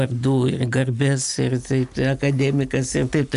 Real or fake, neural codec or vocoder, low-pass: real; none; 10.8 kHz